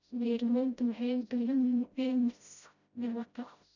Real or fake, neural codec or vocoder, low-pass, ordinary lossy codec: fake; codec, 16 kHz, 0.5 kbps, FreqCodec, smaller model; 7.2 kHz; none